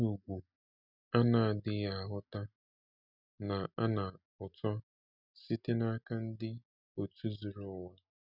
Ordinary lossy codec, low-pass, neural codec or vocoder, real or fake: none; 5.4 kHz; none; real